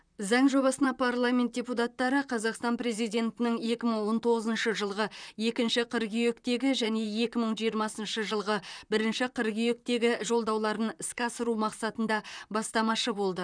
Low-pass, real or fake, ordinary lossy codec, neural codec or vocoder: 9.9 kHz; fake; none; vocoder, 44.1 kHz, 128 mel bands, Pupu-Vocoder